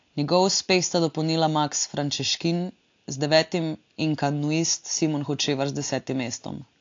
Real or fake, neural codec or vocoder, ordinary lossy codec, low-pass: real; none; AAC, 48 kbps; 7.2 kHz